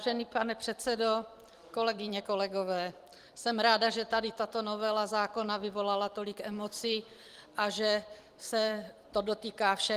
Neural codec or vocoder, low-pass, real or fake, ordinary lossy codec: vocoder, 44.1 kHz, 128 mel bands every 256 samples, BigVGAN v2; 14.4 kHz; fake; Opus, 24 kbps